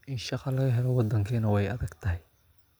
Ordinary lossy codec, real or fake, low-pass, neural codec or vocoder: none; real; none; none